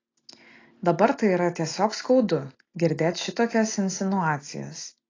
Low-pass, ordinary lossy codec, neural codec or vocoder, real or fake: 7.2 kHz; AAC, 32 kbps; none; real